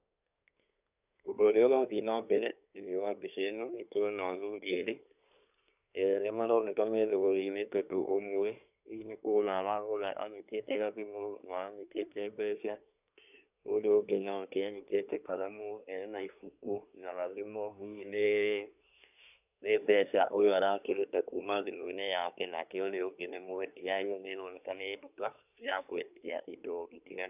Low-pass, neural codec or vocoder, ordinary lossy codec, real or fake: 3.6 kHz; codec, 24 kHz, 1 kbps, SNAC; none; fake